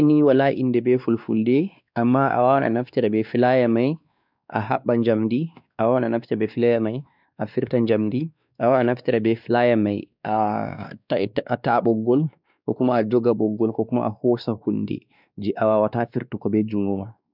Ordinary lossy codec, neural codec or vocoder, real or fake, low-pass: none; codec, 16 kHz, 2 kbps, X-Codec, WavLM features, trained on Multilingual LibriSpeech; fake; 5.4 kHz